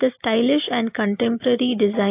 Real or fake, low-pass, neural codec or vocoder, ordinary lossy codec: real; 3.6 kHz; none; AAC, 24 kbps